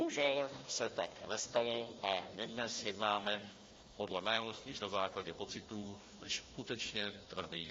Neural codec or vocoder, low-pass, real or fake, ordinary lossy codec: codec, 16 kHz, 1 kbps, FunCodec, trained on Chinese and English, 50 frames a second; 7.2 kHz; fake; AAC, 32 kbps